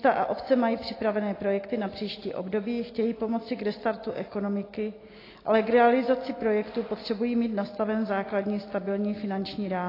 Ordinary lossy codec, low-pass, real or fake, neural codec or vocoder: AAC, 24 kbps; 5.4 kHz; real; none